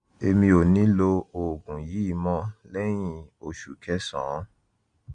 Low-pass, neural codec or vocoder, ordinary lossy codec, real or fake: 9.9 kHz; none; Opus, 64 kbps; real